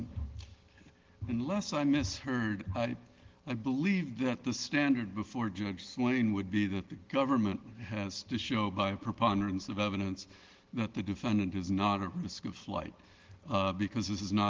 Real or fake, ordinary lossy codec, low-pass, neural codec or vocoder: real; Opus, 16 kbps; 7.2 kHz; none